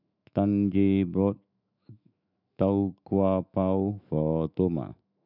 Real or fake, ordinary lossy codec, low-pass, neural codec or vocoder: fake; none; 5.4 kHz; autoencoder, 48 kHz, 128 numbers a frame, DAC-VAE, trained on Japanese speech